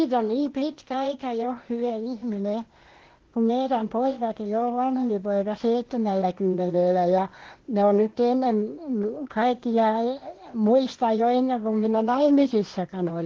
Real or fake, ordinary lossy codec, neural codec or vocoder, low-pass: fake; Opus, 16 kbps; codec, 16 kHz, 0.8 kbps, ZipCodec; 7.2 kHz